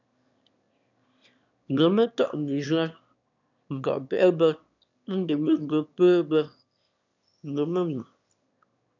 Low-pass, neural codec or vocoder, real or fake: 7.2 kHz; autoencoder, 22.05 kHz, a latent of 192 numbers a frame, VITS, trained on one speaker; fake